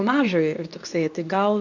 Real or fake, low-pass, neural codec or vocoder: fake; 7.2 kHz; codec, 24 kHz, 0.9 kbps, WavTokenizer, medium speech release version 2